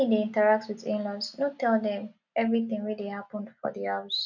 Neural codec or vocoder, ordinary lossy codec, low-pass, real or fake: none; none; 7.2 kHz; real